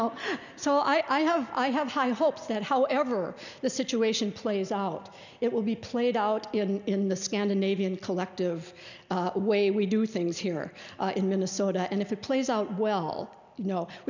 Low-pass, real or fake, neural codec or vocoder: 7.2 kHz; real; none